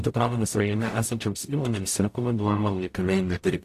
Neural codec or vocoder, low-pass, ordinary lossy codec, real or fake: codec, 44.1 kHz, 0.9 kbps, DAC; 14.4 kHz; AAC, 64 kbps; fake